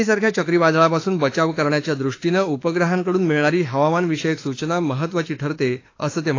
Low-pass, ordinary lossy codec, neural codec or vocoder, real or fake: 7.2 kHz; AAC, 32 kbps; autoencoder, 48 kHz, 32 numbers a frame, DAC-VAE, trained on Japanese speech; fake